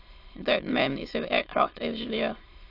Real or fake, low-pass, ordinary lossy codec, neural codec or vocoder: fake; 5.4 kHz; AAC, 32 kbps; autoencoder, 22.05 kHz, a latent of 192 numbers a frame, VITS, trained on many speakers